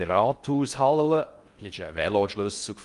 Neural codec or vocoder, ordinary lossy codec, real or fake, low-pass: codec, 16 kHz in and 24 kHz out, 0.6 kbps, FocalCodec, streaming, 4096 codes; Opus, 32 kbps; fake; 10.8 kHz